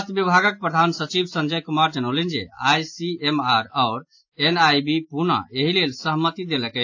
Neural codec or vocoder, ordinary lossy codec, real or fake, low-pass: none; AAC, 48 kbps; real; 7.2 kHz